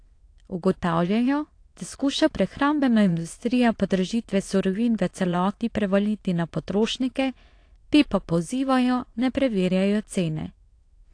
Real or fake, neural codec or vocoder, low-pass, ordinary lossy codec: fake; autoencoder, 22.05 kHz, a latent of 192 numbers a frame, VITS, trained on many speakers; 9.9 kHz; AAC, 48 kbps